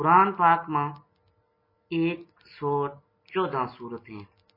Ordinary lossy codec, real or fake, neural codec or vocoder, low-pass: MP3, 24 kbps; real; none; 5.4 kHz